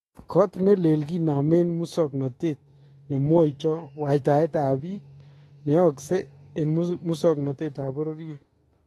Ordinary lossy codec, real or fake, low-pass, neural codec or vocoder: AAC, 32 kbps; fake; 19.8 kHz; autoencoder, 48 kHz, 32 numbers a frame, DAC-VAE, trained on Japanese speech